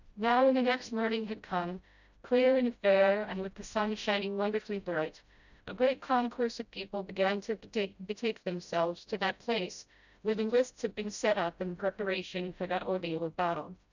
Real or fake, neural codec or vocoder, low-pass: fake; codec, 16 kHz, 0.5 kbps, FreqCodec, smaller model; 7.2 kHz